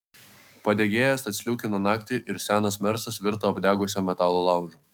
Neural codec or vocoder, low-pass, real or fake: codec, 44.1 kHz, 7.8 kbps, DAC; 19.8 kHz; fake